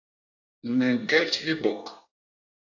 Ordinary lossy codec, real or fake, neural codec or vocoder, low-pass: AAC, 48 kbps; fake; codec, 24 kHz, 1 kbps, SNAC; 7.2 kHz